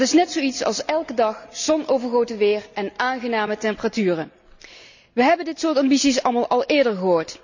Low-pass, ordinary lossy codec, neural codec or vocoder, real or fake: 7.2 kHz; none; none; real